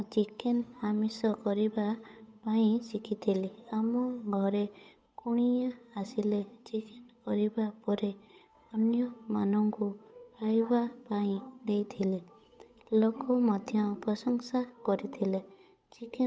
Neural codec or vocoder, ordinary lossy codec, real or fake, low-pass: codec, 16 kHz, 8 kbps, FunCodec, trained on Chinese and English, 25 frames a second; none; fake; none